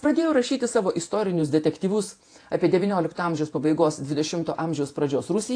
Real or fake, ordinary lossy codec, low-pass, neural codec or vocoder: fake; AAC, 48 kbps; 9.9 kHz; vocoder, 48 kHz, 128 mel bands, Vocos